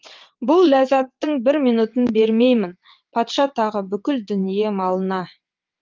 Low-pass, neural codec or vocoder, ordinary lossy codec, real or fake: 7.2 kHz; vocoder, 22.05 kHz, 80 mel bands, WaveNeXt; Opus, 32 kbps; fake